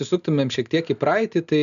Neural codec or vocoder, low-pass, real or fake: none; 7.2 kHz; real